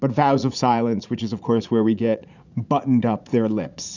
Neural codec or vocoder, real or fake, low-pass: vocoder, 44.1 kHz, 80 mel bands, Vocos; fake; 7.2 kHz